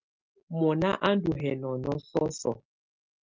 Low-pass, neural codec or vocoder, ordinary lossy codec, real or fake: 7.2 kHz; none; Opus, 32 kbps; real